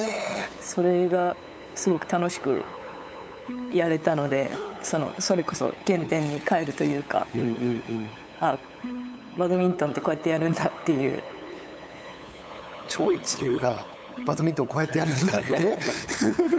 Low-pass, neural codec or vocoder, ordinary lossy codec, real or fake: none; codec, 16 kHz, 8 kbps, FunCodec, trained on LibriTTS, 25 frames a second; none; fake